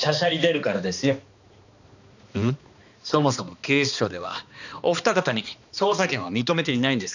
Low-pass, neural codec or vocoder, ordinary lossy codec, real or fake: 7.2 kHz; codec, 16 kHz, 2 kbps, X-Codec, HuBERT features, trained on balanced general audio; none; fake